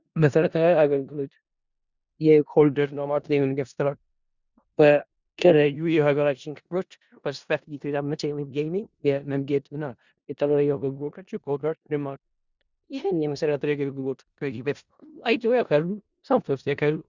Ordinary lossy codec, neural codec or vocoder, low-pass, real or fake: Opus, 64 kbps; codec, 16 kHz in and 24 kHz out, 0.4 kbps, LongCat-Audio-Codec, four codebook decoder; 7.2 kHz; fake